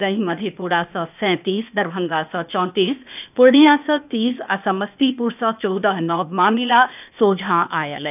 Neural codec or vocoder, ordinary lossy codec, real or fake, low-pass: codec, 16 kHz, 0.8 kbps, ZipCodec; none; fake; 3.6 kHz